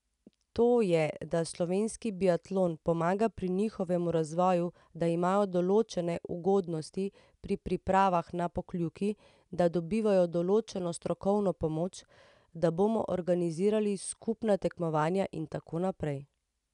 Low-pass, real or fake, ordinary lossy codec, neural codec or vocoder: 10.8 kHz; real; none; none